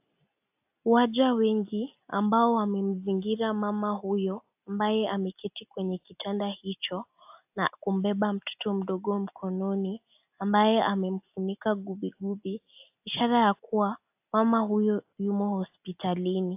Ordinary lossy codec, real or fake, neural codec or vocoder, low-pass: AAC, 32 kbps; real; none; 3.6 kHz